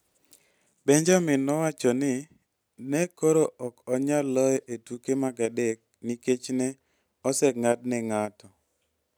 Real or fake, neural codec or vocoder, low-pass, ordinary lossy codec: real; none; none; none